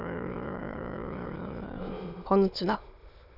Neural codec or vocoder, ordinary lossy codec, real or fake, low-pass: autoencoder, 22.05 kHz, a latent of 192 numbers a frame, VITS, trained on many speakers; none; fake; 5.4 kHz